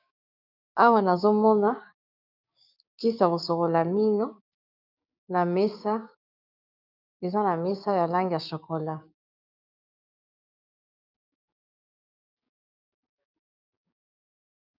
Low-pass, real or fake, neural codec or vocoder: 5.4 kHz; fake; codec, 16 kHz, 6 kbps, DAC